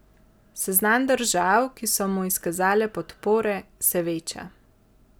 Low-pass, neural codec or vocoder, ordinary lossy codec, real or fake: none; none; none; real